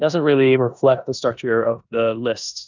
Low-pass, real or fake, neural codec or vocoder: 7.2 kHz; fake; codec, 16 kHz in and 24 kHz out, 0.9 kbps, LongCat-Audio-Codec, four codebook decoder